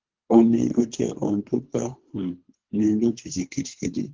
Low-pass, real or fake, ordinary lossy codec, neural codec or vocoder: 7.2 kHz; fake; Opus, 16 kbps; codec, 24 kHz, 3 kbps, HILCodec